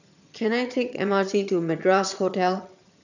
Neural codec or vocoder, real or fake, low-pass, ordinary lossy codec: vocoder, 22.05 kHz, 80 mel bands, HiFi-GAN; fake; 7.2 kHz; none